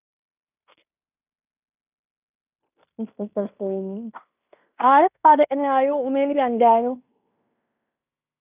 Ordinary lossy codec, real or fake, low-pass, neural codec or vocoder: none; fake; 3.6 kHz; codec, 16 kHz in and 24 kHz out, 0.9 kbps, LongCat-Audio-Codec, fine tuned four codebook decoder